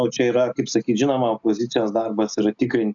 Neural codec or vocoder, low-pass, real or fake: none; 7.2 kHz; real